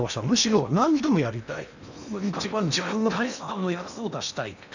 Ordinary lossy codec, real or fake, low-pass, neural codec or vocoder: none; fake; 7.2 kHz; codec, 16 kHz in and 24 kHz out, 0.8 kbps, FocalCodec, streaming, 65536 codes